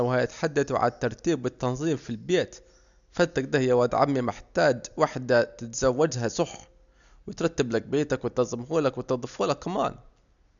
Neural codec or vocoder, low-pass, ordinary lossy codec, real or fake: none; 7.2 kHz; none; real